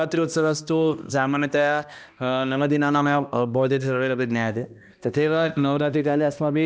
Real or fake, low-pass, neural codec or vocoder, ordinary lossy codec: fake; none; codec, 16 kHz, 1 kbps, X-Codec, HuBERT features, trained on balanced general audio; none